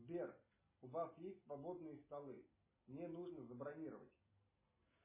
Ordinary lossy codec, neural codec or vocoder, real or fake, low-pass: MP3, 16 kbps; none; real; 3.6 kHz